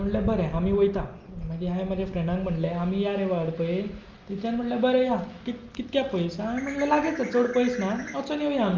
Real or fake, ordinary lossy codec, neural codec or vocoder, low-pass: real; Opus, 24 kbps; none; 7.2 kHz